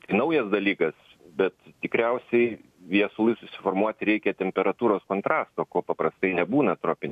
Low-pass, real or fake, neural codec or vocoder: 14.4 kHz; real; none